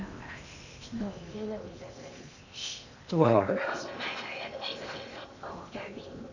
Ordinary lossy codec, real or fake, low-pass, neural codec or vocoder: none; fake; 7.2 kHz; codec, 16 kHz in and 24 kHz out, 0.8 kbps, FocalCodec, streaming, 65536 codes